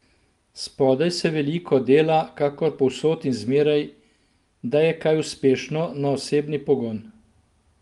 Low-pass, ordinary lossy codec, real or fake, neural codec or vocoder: 10.8 kHz; Opus, 32 kbps; real; none